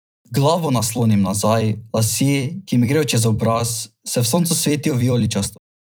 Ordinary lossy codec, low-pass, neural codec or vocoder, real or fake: none; none; vocoder, 44.1 kHz, 128 mel bands every 256 samples, BigVGAN v2; fake